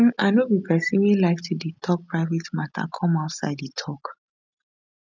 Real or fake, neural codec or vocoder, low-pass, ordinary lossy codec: real; none; 7.2 kHz; none